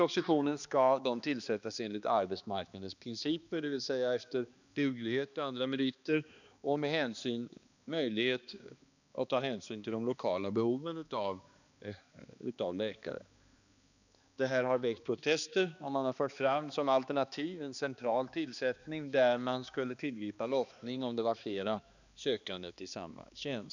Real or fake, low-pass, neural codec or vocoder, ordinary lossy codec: fake; 7.2 kHz; codec, 16 kHz, 2 kbps, X-Codec, HuBERT features, trained on balanced general audio; none